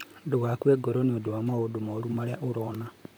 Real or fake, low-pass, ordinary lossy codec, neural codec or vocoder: fake; none; none; vocoder, 44.1 kHz, 128 mel bands, Pupu-Vocoder